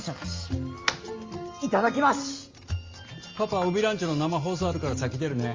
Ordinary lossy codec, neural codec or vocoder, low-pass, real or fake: Opus, 32 kbps; none; 7.2 kHz; real